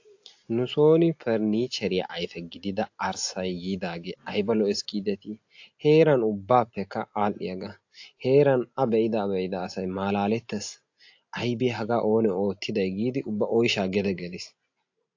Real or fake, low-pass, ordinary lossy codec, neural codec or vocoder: real; 7.2 kHz; AAC, 48 kbps; none